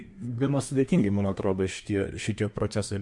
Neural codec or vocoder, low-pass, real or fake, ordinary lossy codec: codec, 24 kHz, 1 kbps, SNAC; 10.8 kHz; fake; MP3, 64 kbps